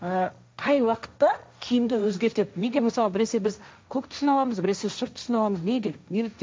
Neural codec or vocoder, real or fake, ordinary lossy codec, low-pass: codec, 16 kHz, 1.1 kbps, Voila-Tokenizer; fake; none; none